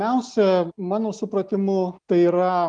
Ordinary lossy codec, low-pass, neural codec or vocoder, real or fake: Opus, 32 kbps; 9.9 kHz; none; real